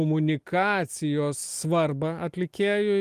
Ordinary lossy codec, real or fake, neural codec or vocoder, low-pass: Opus, 24 kbps; real; none; 14.4 kHz